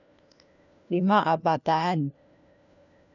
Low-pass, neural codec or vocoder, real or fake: 7.2 kHz; codec, 16 kHz, 1 kbps, FunCodec, trained on LibriTTS, 50 frames a second; fake